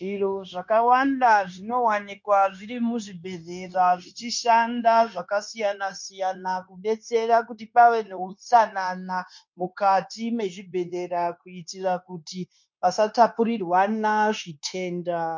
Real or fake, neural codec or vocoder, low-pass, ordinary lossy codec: fake; codec, 16 kHz, 0.9 kbps, LongCat-Audio-Codec; 7.2 kHz; MP3, 48 kbps